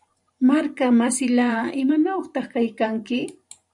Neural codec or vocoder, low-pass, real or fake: vocoder, 44.1 kHz, 128 mel bands every 512 samples, BigVGAN v2; 10.8 kHz; fake